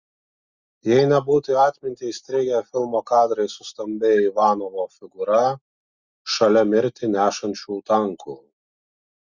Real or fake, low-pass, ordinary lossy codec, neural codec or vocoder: real; 7.2 kHz; Opus, 64 kbps; none